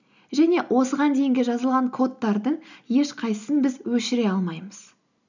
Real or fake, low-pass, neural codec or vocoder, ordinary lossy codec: real; 7.2 kHz; none; none